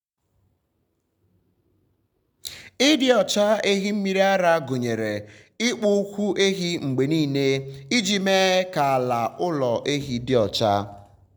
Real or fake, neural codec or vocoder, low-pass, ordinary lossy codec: real; none; none; none